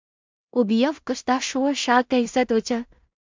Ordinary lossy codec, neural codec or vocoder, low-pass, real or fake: MP3, 64 kbps; codec, 16 kHz in and 24 kHz out, 0.4 kbps, LongCat-Audio-Codec, two codebook decoder; 7.2 kHz; fake